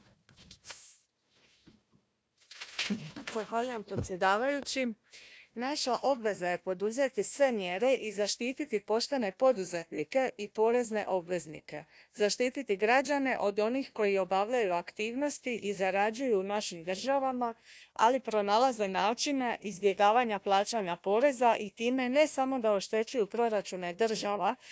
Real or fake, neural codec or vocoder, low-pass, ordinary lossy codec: fake; codec, 16 kHz, 1 kbps, FunCodec, trained on Chinese and English, 50 frames a second; none; none